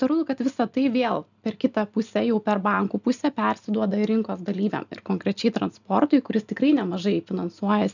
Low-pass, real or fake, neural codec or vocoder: 7.2 kHz; real; none